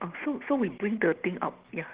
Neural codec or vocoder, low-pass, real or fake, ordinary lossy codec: none; 3.6 kHz; real; Opus, 16 kbps